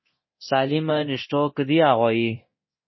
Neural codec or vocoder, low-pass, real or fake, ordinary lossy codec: codec, 24 kHz, 0.9 kbps, DualCodec; 7.2 kHz; fake; MP3, 24 kbps